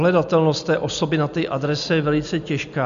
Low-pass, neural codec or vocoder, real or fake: 7.2 kHz; none; real